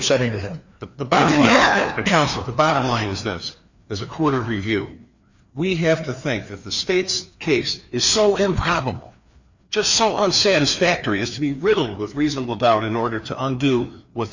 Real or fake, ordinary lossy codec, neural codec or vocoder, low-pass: fake; Opus, 64 kbps; codec, 16 kHz, 2 kbps, FreqCodec, larger model; 7.2 kHz